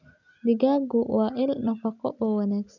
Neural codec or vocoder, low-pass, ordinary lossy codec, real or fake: none; 7.2 kHz; none; real